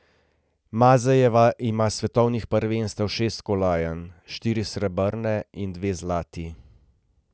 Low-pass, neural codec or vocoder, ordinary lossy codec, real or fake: none; none; none; real